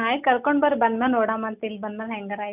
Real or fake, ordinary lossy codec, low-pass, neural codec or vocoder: real; none; 3.6 kHz; none